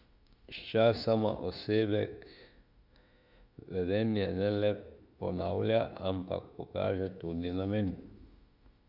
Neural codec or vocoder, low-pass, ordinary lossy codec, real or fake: autoencoder, 48 kHz, 32 numbers a frame, DAC-VAE, trained on Japanese speech; 5.4 kHz; none; fake